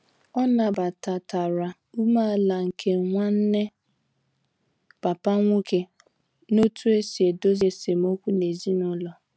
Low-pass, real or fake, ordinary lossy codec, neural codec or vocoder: none; real; none; none